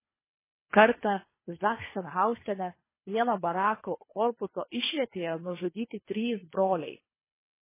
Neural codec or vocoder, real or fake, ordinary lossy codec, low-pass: codec, 24 kHz, 3 kbps, HILCodec; fake; MP3, 16 kbps; 3.6 kHz